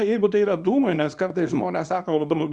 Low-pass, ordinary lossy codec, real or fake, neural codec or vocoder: 10.8 kHz; Opus, 64 kbps; fake; codec, 24 kHz, 0.9 kbps, WavTokenizer, small release